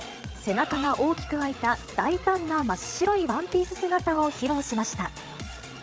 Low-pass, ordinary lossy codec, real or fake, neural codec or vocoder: none; none; fake; codec, 16 kHz, 8 kbps, FreqCodec, larger model